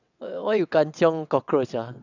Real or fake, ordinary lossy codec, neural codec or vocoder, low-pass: fake; none; vocoder, 22.05 kHz, 80 mel bands, WaveNeXt; 7.2 kHz